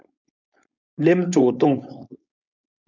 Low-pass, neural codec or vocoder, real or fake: 7.2 kHz; codec, 16 kHz, 4.8 kbps, FACodec; fake